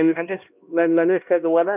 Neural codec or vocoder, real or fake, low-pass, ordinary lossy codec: codec, 16 kHz, 1 kbps, X-Codec, HuBERT features, trained on LibriSpeech; fake; 3.6 kHz; none